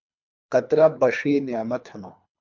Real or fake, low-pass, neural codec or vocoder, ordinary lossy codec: fake; 7.2 kHz; codec, 24 kHz, 3 kbps, HILCodec; MP3, 64 kbps